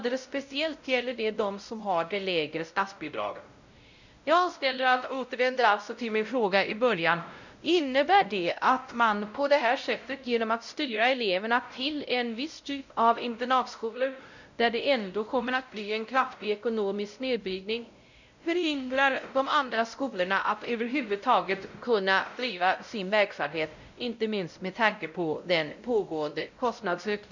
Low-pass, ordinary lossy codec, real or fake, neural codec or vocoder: 7.2 kHz; none; fake; codec, 16 kHz, 0.5 kbps, X-Codec, WavLM features, trained on Multilingual LibriSpeech